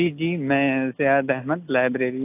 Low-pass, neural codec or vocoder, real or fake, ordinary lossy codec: 3.6 kHz; none; real; AAC, 32 kbps